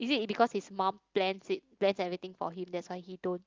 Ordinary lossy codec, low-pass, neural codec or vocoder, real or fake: Opus, 32 kbps; 7.2 kHz; none; real